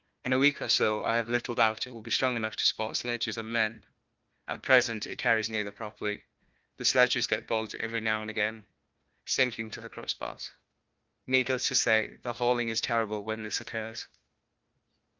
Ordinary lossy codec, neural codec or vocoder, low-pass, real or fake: Opus, 24 kbps; codec, 16 kHz, 1 kbps, FunCodec, trained on Chinese and English, 50 frames a second; 7.2 kHz; fake